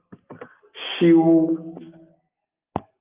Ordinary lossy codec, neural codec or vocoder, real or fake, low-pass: Opus, 32 kbps; none; real; 3.6 kHz